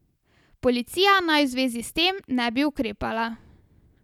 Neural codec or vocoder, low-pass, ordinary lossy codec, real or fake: none; 19.8 kHz; none; real